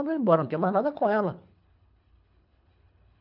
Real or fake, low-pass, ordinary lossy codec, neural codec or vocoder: fake; 5.4 kHz; none; codec, 24 kHz, 3 kbps, HILCodec